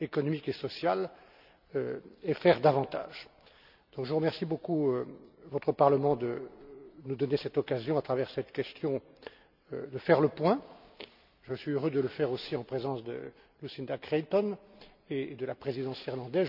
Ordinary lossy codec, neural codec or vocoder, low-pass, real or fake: none; none; 5.4 kHz; real